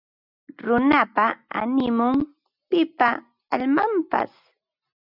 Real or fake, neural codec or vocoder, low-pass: real; none; 5.4 kHz